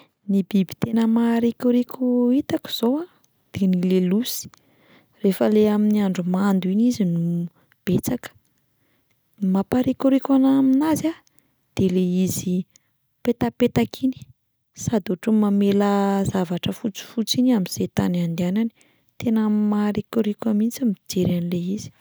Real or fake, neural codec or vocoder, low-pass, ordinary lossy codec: real; none; none; none